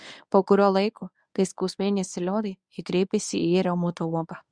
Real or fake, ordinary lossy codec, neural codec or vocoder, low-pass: fake; Opus, 64 kbps; codec, 24 kHz, 0.9 kbps, WavTokenizer, medium speech release version 1; 9.9 kHz